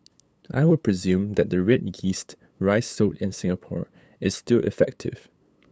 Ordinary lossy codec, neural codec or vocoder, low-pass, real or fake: none; codec, 16 kHz, 8 kbps, FunCodec, trained on LibriTTS, 25 frames a second; none; fake